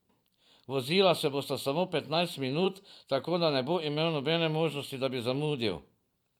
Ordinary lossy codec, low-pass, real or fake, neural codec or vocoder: none; 19.8 kHz; fake; vocoder, 44.1 kHz, 128 mel bands every 512 samples, BigVGAN v2